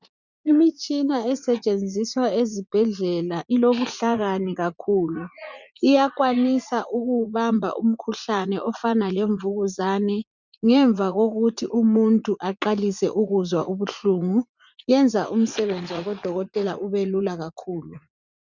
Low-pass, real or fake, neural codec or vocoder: 7.2 kHz; fake; vocoder, 44.1 kHz, 80 mel bands, Vocos